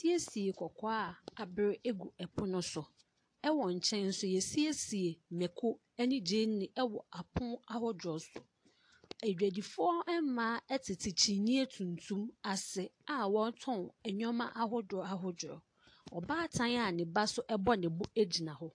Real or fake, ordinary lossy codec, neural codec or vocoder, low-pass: real; AAC, 48 kbps; none; 9.9 kHz